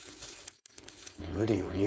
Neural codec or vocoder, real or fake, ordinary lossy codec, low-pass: codec, 16 kHz, 4.8 kbps, FACodec; fake; none; none